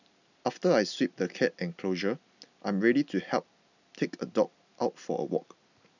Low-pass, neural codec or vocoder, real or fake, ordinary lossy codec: 7.2 kHz; none; real; none